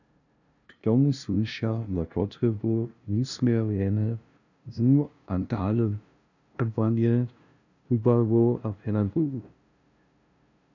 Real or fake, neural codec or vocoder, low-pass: fake; codec, 16 kHz, 0.5 kbps, FunCodec, trained on LibriTTS, 25 frames a second; 7.2 kHz